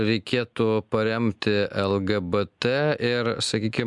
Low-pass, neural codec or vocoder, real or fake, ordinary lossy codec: 10.8 kHz; none; real; MP3, 96 kbps